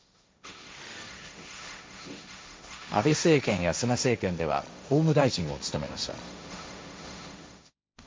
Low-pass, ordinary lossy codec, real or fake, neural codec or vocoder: none; none; fake; codec, 16 kHz, 1.1 kbps, Voila-Tokenizer